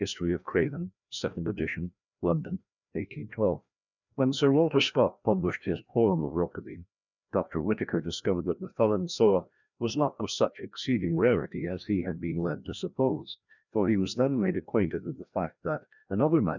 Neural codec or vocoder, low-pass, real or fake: codec, 16 kHz, 1 kbps, FreqCodec, larger model; 7.2 kHz; fake